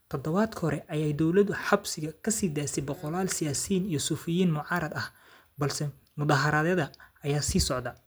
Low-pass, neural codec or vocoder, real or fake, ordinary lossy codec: none; none; real; none